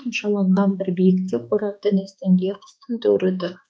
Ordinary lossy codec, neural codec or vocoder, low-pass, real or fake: none; codec, 16 kHz, 2 kbps, X-Codec, HuBERT features, trained on balanced general audio; none; fake